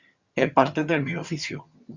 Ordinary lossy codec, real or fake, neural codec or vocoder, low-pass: Opus, 64 kbps; fake; vocoder, 22.05 kHz, 80 mel bands, HiFi-GAN; 7.2 kHz